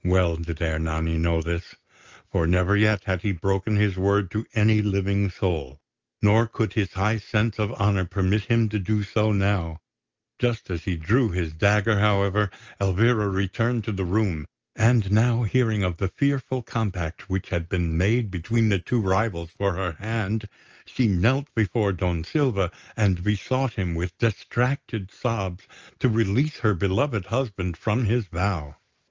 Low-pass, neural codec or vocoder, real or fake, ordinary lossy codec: 7.2 kHz; none; real; Opus, 24 kbps